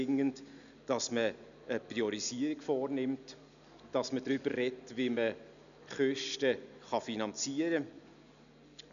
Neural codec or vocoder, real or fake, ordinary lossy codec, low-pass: none; real; none; 7.2 kHz